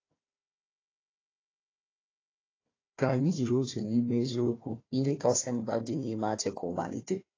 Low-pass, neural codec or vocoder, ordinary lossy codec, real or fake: 7.2 kHz; codec, 16 kHz, 1 kbps, FunCodec, trained on Chinese and English, 50 frames a second; AAC, 32 kbps; fake